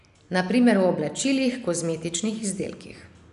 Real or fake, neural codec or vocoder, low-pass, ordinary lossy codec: real; none; 10.8 kHz; none